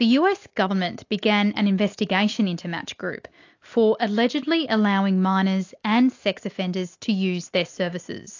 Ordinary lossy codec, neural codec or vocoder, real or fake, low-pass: AAC, 48 kbps; none; real; 7.2 kHz